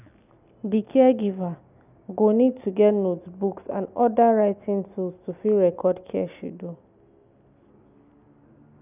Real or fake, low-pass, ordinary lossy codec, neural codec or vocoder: real; 3.6 kHz; none; none